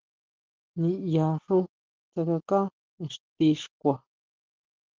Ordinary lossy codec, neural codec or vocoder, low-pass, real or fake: Opus, 16 kbps; none; 7.2 kHz; real